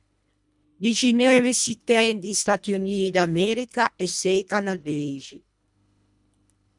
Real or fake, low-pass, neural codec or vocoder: fake; 10.8 kHz; codec, 24 kHz, 1.5 kbps, HILCodec